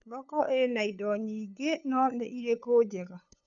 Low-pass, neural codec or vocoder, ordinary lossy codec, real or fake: 7.2 kHz; codec, 16 kHz, 8 kbps, FunCodec, trained on LibriTTS, 25 frames a second; none; fake